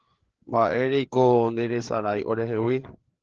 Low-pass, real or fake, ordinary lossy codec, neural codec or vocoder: 7.2 kHz; fake; Opus, 16 kbps; codec, 16 kHz, 4 kbps, FreqCodec, larger model